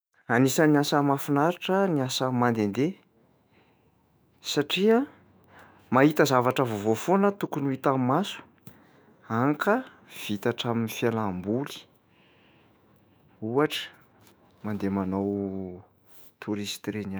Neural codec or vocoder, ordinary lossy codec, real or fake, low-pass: autoencoder, 48 kHz, 128 numbers a frame, DAC-VAE, trained on Japanese speech; none; fake; none